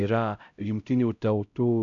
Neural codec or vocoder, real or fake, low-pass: codec, 16 kHz, 0.5 kbps, X-Codec, HuBERT features, trained on LibriSpeech; fake; 7.2 kHz